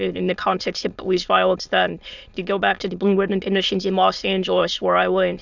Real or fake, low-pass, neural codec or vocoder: fake; 7.2 kHz; autoencoder, 22.05 kHz, a latent of 192 numbers a frame, VITS, trained on many speakers